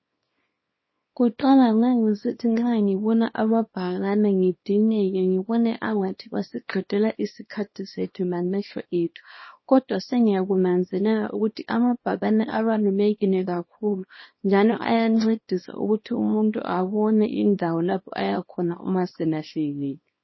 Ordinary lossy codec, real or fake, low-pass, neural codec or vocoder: MP3, 24 kbps; fake; 7.2 kHz; codec, 24 kHz, 0.9 kbps, WavTokenizer, small release